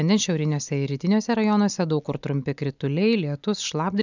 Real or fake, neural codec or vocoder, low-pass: real; none; 7.2 kHz